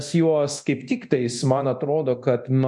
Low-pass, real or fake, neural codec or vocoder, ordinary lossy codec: 10.8 kHz; fake; codec, 24 kHz, 0.9 kbps, DualCodec; MP3, 64 kbps